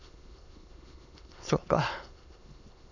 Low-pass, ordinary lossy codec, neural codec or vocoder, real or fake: 7.2 kHz; none; autoencoder, 22.05 kHz, a latent of 192 numbers a frame, VITS, trained on many speakers; fake